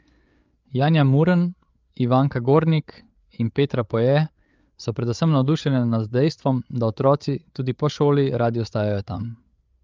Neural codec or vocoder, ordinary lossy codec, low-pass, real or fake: codec, 16 kHz, 8 kbps, FreqCodec, larger model; Opus, 24 kbps; 7.2 kHz; fake